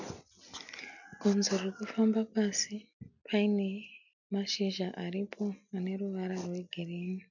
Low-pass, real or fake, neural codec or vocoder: 7.2 kHz; real; none